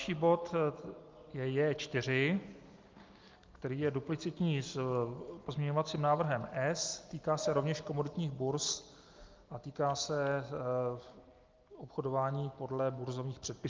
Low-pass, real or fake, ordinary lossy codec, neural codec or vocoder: 7.2 kHz; real; Opus, 32 kbps; none